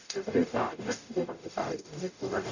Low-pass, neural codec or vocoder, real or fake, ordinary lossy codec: 7.2 kHz; codec, 44.1 kHz, 0.9 kbps, DAC; fake; none